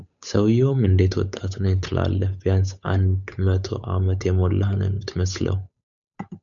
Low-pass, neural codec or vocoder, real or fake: 7.2 kHz; codec, 16 kHz, 8 kbps, FunCodec, trained on Chinese and English, 25 frames a second; fake